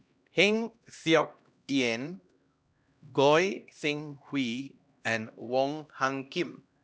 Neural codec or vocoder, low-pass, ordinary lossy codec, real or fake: codec, 16 kHz, 1 kbps, X-Codec, HuBERT features, trained on LibriSpeech; none; none; fake